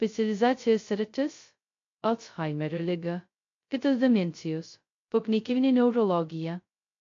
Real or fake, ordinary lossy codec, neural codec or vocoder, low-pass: fake; AAC, 48 kbps; codec, 16 kHz, 0.2 kbps, FocalCodec; 7.2 kHz